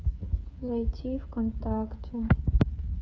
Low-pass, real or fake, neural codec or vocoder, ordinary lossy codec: none; fake; codec, 16 kHz, 8 kbps, FreqCodec, smaller model; none